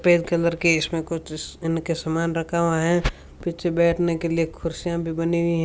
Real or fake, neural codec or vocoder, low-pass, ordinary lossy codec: real; none; none; none